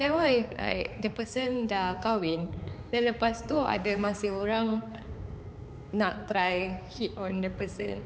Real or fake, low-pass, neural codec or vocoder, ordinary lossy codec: fake; none; codec, 16 kHz, 4 kbps, X-Codec, HuBERT features, trained on balanced general audio; none